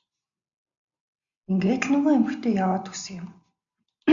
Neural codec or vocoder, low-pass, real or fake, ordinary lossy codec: none; 7.2 kHz; real; AAC, 64 kbps